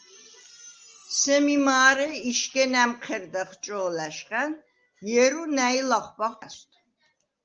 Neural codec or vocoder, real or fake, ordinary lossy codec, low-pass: none; real; Opus, 24 kbps; 7.2 kHz